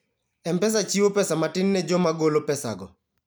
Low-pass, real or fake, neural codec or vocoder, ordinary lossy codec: none; real; none; none